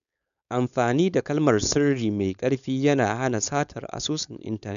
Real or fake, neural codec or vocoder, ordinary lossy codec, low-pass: fake; codec, 16 kHz, 4.8 kbps, FACodec; none; 7.2 kHz